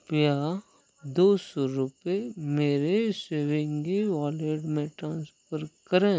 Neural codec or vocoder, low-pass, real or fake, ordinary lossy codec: none; none; real; none